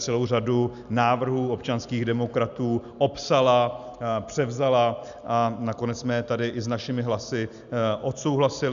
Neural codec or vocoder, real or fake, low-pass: none; real; 7.2 kHz